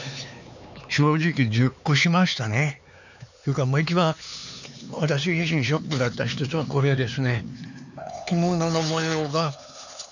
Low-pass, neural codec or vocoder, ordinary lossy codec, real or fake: 7.2 kHz; codec, 16 kHz, 4 kbps, X-Codec, HuBERT features, trained on LibriSpeech; none; fake